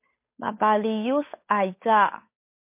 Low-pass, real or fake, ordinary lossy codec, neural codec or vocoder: 3.6 kHz; fake; MP3, 24 kbps; codec, 16 kHz, 8 kbps, FunCodec, trained on Chinese and English, 25 frames a second